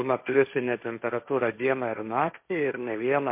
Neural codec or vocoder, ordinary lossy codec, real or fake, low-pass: codec, 16 kHz, 1.1 kbps, Voila-Tokenizer; MP3, 32 kbps; fake; 3.6 kHz